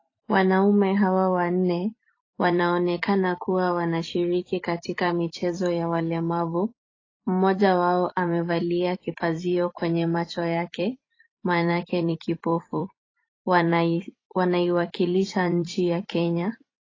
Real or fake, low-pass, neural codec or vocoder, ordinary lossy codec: fake; 7.2 kHz; vocoder, 44.1 kHz, 128 mel bands every 256 samples, BigVGAN v2; AAC, 32 kbps